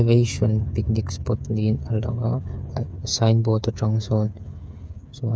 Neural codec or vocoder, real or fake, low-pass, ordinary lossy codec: codec, 16 kHz, 8 kbps, FreqCodec, smaller model; fake; none; none